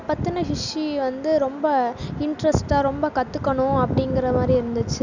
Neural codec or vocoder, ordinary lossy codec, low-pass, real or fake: none; none; 7.2 kHz; real